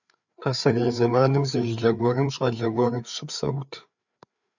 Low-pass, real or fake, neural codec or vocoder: 7.2 kHz; fake; codec, 16 kHz, 4 kbps, FreqCodec, larger model